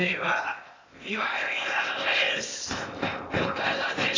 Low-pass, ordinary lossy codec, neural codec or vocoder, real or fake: 7.2 kHz; AAC, 32 kbps; codec, 16 kHz in and 24 kHz out, 0.8 kbps, FocalCodec, streaming, 65536 codes; fake